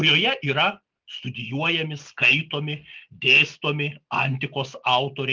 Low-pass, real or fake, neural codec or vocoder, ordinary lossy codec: 7.2 kHz; real; none; Opus, 24 kbps